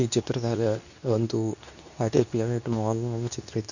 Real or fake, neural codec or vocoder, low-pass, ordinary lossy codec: fake; codec, 24 kHz, 0.9 kbps, WavTokenizer, medium speech release version 2; 7.2 kHz; none